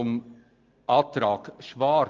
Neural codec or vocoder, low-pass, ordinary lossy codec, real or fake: none; 7.2 kHz; Opus, 24 kbps; real